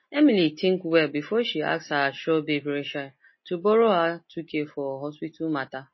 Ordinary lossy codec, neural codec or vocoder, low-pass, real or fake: MP3, 24 kbps; none; 7.2 kHz; real